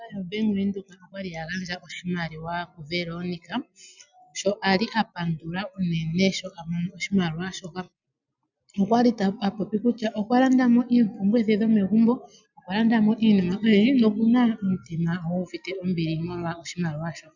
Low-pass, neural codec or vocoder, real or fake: 7.2 kHz; none; real